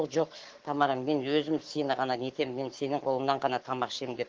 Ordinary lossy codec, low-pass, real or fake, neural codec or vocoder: Opus, 16 kbps; 7.2 kHz; fake; codec, 44.1 kHz, 7.8 kbps, Pupu-Codec